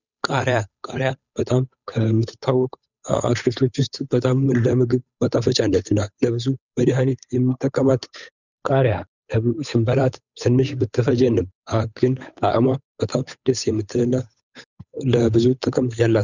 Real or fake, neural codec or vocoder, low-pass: fake; codec, 16 kHz, 8 kbps, FunCodec, trained on Chinese and English, 25 frames a second; 7.2 kHz